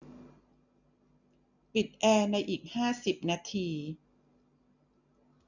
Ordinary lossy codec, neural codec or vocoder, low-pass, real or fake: none; none; 7.2 kHz; real